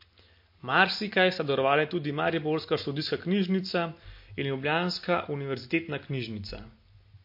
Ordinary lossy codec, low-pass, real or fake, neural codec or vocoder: MP3, 32 kbps; 5.4 kHz; real; none